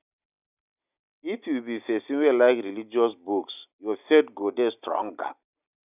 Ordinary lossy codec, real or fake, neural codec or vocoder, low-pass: none; real; none; 3.6 kHz